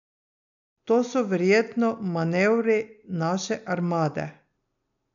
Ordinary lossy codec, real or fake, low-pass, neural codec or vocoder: none; real; 7.2 kHz; none